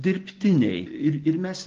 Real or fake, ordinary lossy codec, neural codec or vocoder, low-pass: real; Opus, 16 kbps; none; 7.2 kHz